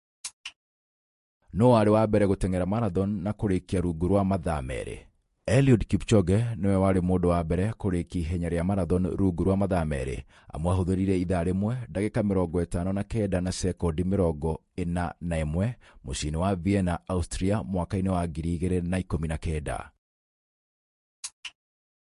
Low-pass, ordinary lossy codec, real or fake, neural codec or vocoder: 14.4 kHz; MP3, 48 kbps; fake; vocoder, 48 kHz, 128 mel bands, Vocos